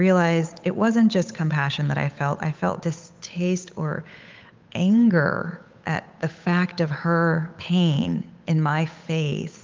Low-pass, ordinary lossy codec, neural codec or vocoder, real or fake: 7.2 kHz; Opus, 24 kbps; codec, 16 kHz, 8 kbps, FunCodec, trained on Chinese and English, 25 frames a second; fake